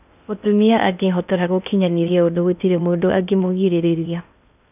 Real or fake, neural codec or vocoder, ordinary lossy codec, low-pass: fake; codec, 16 kHz in and 24 kHz out, 0.6 kbps, FocalCodec, streaming, 4096 codes; none; 3.6 kHz